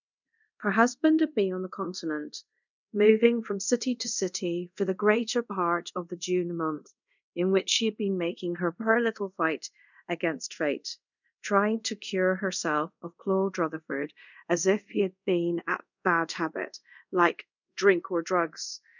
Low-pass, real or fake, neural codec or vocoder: 7.2 kHz; fake; codec, 24 kHz, 0.5 kbps, DualCodec